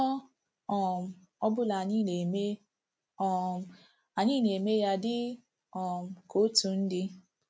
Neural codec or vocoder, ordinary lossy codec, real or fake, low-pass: none; none; real; none